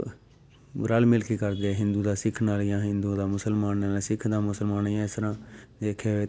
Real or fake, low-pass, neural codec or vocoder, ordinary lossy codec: real; none; none; none